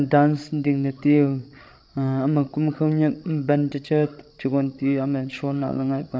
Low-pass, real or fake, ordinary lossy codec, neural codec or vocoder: none; fake; none; codec, 16 kHz, 16 kbps, FreqCodec, larger model